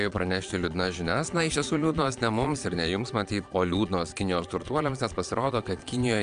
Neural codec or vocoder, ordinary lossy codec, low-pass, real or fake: vocoder, 22.05 kHz, 80 mel bands, Vocos; Opus, 64 kbps; 9.9 kHz; fake